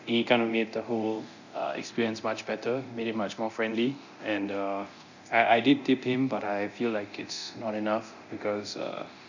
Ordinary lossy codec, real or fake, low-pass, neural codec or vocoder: none; fake; 7.2 kHz; codec, 24 kHz, 0.9 kbps, DualCodec